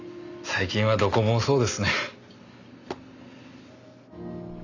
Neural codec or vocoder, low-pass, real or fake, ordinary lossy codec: none; 7.2 kHz; real; Opus, 64 kbps